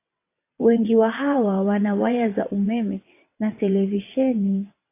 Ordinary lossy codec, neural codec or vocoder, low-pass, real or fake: AAC, 24 kbps; vocoder, 22.05 kHz, 80 mel bands, WaveNeXt; 3.6 kHz; fake